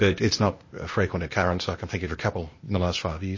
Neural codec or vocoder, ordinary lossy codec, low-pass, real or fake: codec, 16 kHz, 0.8 kbps, ZipCodec; MP3, 32 kbps; 7.2 kHz; fake